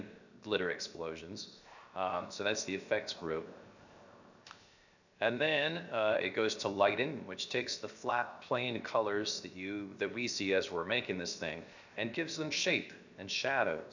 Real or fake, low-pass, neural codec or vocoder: fake; 7.2 kHz; codec, 16 kHz, 0.7 kbps, FocalCodec